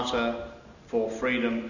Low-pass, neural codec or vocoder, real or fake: 7.2 kHz; none; real